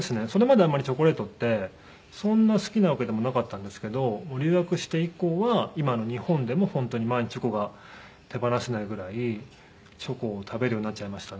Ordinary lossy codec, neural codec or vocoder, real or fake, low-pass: none; none; real; none